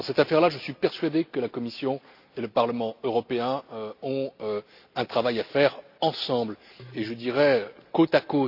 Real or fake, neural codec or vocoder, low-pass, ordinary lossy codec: real; none; 5.4 kHz; none